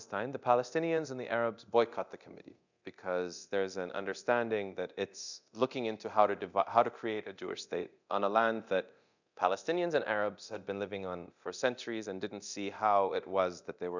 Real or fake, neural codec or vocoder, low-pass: fake; codec, 24 kHz, 0.9 kbps, DualCodec; 7.2 kHz